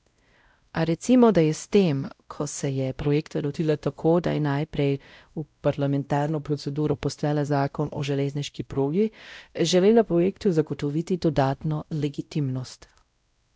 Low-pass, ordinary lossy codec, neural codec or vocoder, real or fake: none; none; codec, 16 kHz, 0.5 kbps, X-Codec, WavLM features, trained on Multilingual LibriSpeech; fake